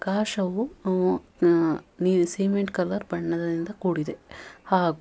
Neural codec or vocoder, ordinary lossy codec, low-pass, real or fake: none; none; none; real